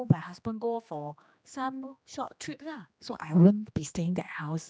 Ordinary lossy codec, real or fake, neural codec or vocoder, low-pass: none; fake; codec, 16 kHz, 2 kbps, X-Codec, HuBERT features, trained on general audio; none